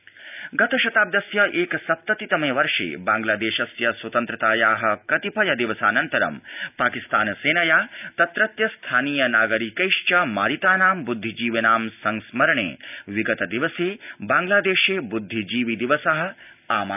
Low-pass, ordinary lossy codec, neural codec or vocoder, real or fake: 3.6 kHz; none; none; real